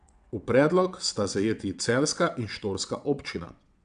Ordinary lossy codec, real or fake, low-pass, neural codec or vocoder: none; fake; 9.9 kHz; vocoder, 22.05 kHz, 80 mel bands, WaveNeXt